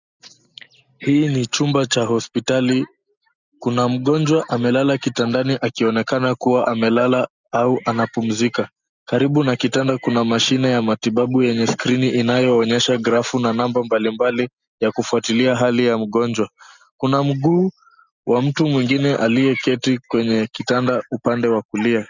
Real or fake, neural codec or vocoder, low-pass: real; none; 7.2 kHz